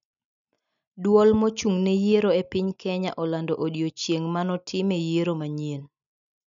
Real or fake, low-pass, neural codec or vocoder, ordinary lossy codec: real; 7.2 kHz; none; MP3, 96 kbps